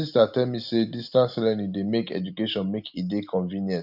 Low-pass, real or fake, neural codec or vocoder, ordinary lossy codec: 5.4 kHz; real; none; none